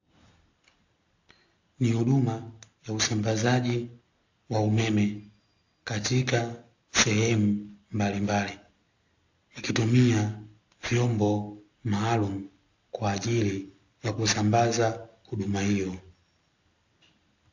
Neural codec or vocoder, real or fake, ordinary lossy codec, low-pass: none; real; AAC, 48 kbps; 7.2 kHz